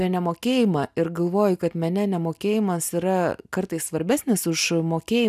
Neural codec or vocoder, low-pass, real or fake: none; 14.4 kHz; real